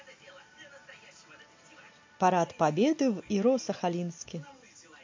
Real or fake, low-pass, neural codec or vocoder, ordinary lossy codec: real; 7.2 kHz; none; MP3, 48 kbps